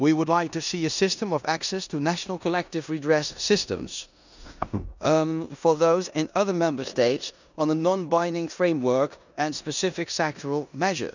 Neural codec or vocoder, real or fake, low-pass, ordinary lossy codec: codec, 16 kHz in and 24 kHz out, 0.9 kbps, LongCat-Audio-Codec, four codebook decoder; fake; 7.2 kHz; none